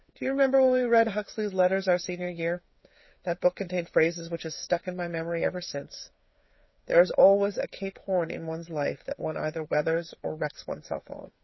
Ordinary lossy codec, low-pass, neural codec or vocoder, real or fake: MP3, 24 kbps; 7.2 kHz; codec, 16 kHz, 8 kbps, FreqCodec, smaller model; fake